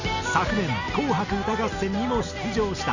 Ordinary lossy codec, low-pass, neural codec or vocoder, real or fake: none; 7.2 kHz; none; real